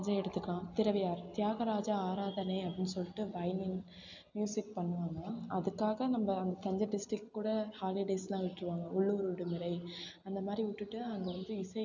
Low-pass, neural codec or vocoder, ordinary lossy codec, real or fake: 7.2 kHz; none; none; real